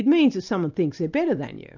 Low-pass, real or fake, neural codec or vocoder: 7.2 kHz; real; none